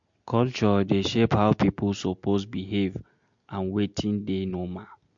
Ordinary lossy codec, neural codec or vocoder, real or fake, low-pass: MP3, 48 kbps; none; real; 7.2 kHz